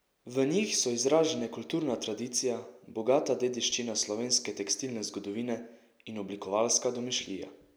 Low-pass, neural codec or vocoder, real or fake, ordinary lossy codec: none; none; real; none